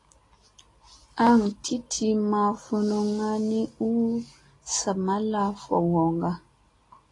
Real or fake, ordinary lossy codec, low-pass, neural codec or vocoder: real; AAC, 32 kbps; 10.8 kHz; none